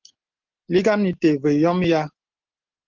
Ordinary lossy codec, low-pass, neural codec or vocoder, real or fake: Opus, 16 kbps; 7.2 kHz; none; real